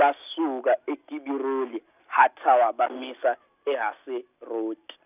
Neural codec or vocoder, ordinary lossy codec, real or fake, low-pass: none; none; real; 3.6 kHz